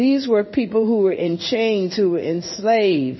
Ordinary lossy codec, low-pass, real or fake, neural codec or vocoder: MP3, 24 kbps; 7.2 kHz; fake; codec, 16 kHz in and 24 kHz out, 1 kbps, XY-Tokenizer